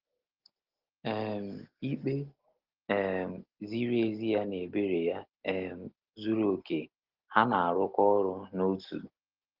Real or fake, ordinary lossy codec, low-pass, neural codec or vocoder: real; Opus, 16 kbps; 5.4 kHz; none